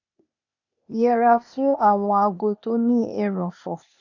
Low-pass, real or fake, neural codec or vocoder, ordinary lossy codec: 7.2 kHz; fake; codec, 16 kHz, 0.8 kbps, ZipCodec; none